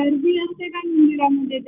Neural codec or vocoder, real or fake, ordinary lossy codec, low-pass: none; real; Opus, 64 kbps; 3.6 kHz